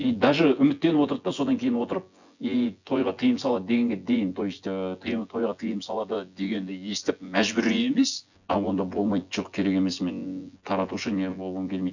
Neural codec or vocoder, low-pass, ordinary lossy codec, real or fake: vocoder, 24 kHz, 100 mel bands, Vocos; 7.2 kHz; none; fake